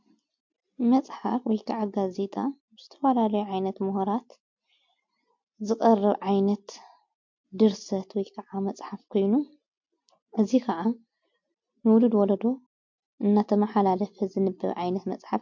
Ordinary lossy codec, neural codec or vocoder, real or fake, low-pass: MP3, 48 kbps; none; real; 7.2 kHz